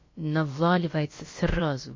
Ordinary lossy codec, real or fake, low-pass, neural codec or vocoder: MP3, 32 kbps; fake; 7.2 kHz; codec, 16 kHz, about 1 kbps, DyCAST, with the encoder's durations